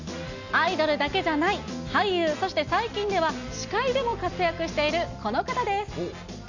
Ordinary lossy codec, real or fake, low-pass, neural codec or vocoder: none; real; 7.2 kHz; none